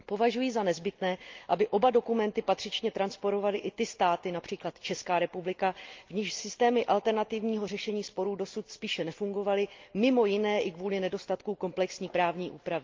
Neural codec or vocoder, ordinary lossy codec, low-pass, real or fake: none; Opus, 32 kbps; 7.2 kHz; real